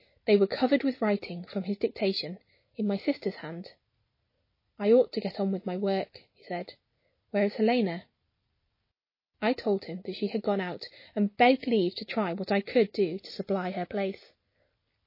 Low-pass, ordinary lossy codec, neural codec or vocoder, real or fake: 5.4 kHz; MP3, 24 kbps; none; real